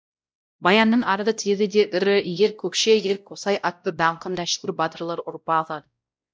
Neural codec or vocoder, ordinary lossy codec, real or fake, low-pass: codec, 16 kHz, 0.5 kbps, X-Codec, WavLM features, trained on Multilingual LibriSpeech; none; fake; none